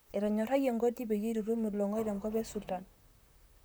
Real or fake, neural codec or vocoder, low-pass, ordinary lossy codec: fake; vocoder, 44.1 kHz, 128 mel bands, Pupu-Vocoder; none; none